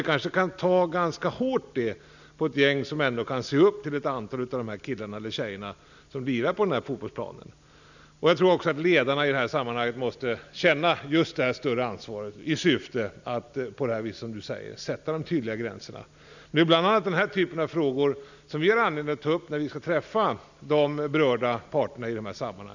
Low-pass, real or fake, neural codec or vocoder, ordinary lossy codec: 7.2 kHz; real; none; none